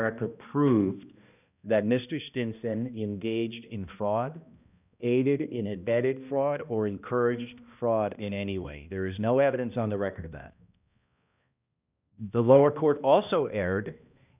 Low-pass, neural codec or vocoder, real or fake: 3.6 kHz; codec, 16 kHz, 1 kbps, X-Codec, HuBERT features, trained on balanced general audio; fake